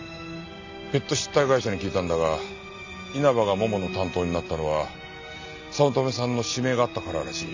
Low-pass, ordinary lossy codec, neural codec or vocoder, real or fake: 7.2 kHz; AAC, 48 kbps; none; real